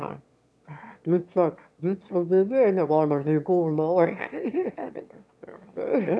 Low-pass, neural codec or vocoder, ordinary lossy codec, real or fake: none; autoencoder, 22.05 kHz, a latent of 192 numbers a frame, VITS, trained on one speaker; none; fake